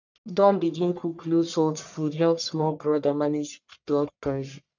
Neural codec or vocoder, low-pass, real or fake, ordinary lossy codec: codec, 44.1 kHz, 1.7 kbps, Pupu-Codec; 7.2 kHz; fake; none